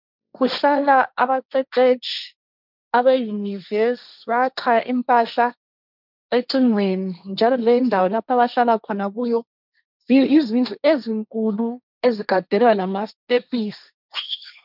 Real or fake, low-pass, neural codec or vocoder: fake; 5.4 kHz; codec, 16 kHz, 1.1 kbps, Voila-Tokenizer